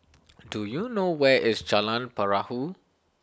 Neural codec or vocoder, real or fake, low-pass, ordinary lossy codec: none; real; none; none